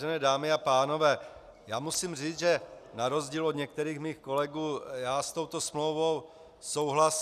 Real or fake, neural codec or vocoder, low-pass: real; none; 14.4 kHz